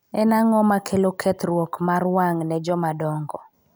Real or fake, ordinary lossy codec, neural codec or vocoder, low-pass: real; none; none; none